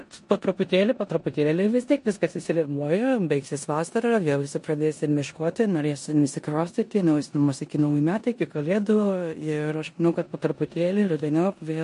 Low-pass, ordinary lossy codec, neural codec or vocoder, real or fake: 10.8 kHz; MP3, 48 kbps; codec, 16 kHz in and 24 kHz out, 0.9 kbps, LongCat-Audio-Codec, four codebook decoder; fake